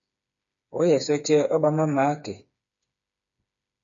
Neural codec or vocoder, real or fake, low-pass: codec, 16 kHz, 4 kbps, FreqCodec, smaller model; fake; 7.2 kHz